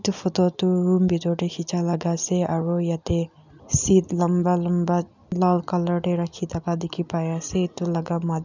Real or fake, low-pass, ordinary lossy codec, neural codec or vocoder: real; 7.2 kHz; none; none